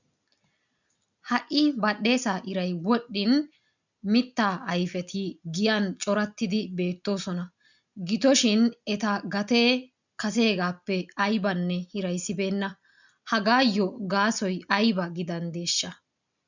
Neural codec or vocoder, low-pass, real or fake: none; 7.2 kHz; real